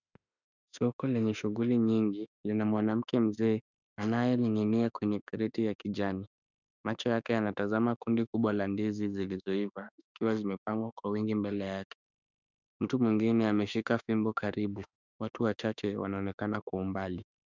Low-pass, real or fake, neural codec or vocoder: 7.2 kHz; fake; autoencoder, 48 kHz, 32 numbers a frame, DAC-VAE, trained on Japanese speech